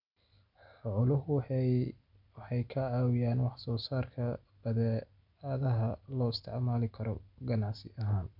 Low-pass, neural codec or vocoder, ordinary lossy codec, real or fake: 5.4 kHz; none; none; real